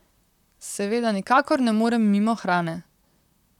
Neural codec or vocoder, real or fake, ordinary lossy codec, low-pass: vocoder, 44.1 kHz, 128 mel bands, Pupu-Vocoder; fake; none; 19.8 kHz